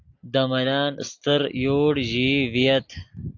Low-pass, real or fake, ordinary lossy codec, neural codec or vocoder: 7.2 kHz; real; AAC, 48 kbps; none